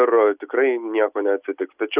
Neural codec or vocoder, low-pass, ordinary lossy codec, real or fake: none; 3.6 kHz; Opus, 64 kbps; real